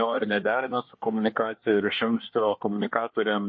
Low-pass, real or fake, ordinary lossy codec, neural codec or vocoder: 7.2 kHz; fake; MP3, 32 kbps; codec, 24 kHz, 1 kbps, SNAC